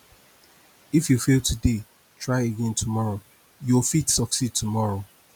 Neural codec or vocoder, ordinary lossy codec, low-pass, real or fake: none; none; none; real